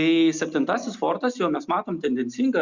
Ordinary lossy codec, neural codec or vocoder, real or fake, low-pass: Opus, 64 kbps; none; real; 7.2 kHz